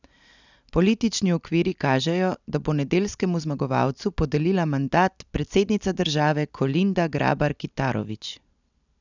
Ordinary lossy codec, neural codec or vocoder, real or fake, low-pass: none; none; real; 7.2 kHz